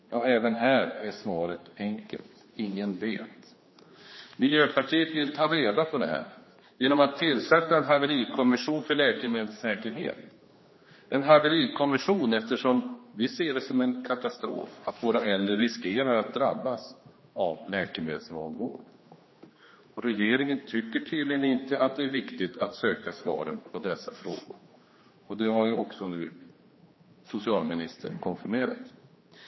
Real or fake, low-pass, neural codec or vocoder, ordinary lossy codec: fake; 7.2 kHz; codec, 16 kHz, 2 kbps, X-Codec, HuBERT features, trained on general audio; MP3, 24 kbps